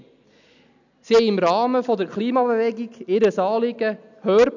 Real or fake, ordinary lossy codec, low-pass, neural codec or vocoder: real; none; 7.2 kHz; none